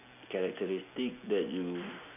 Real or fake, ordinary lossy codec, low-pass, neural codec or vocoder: real; none; 3.6 kHz; none